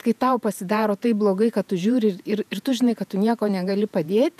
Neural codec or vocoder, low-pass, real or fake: vocoder, 48 kHz, 128 mel bands, Vocos; 14.4 kHz; fake